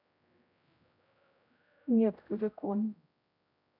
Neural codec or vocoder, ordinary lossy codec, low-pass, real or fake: codec, 16 kHz, 0.5 kbps, X-Codec, HuBERT features, trained on general audio; none; 5.4 kHz; fake